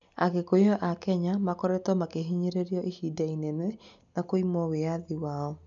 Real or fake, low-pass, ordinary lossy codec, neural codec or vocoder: real; 7.2 kHz; none; none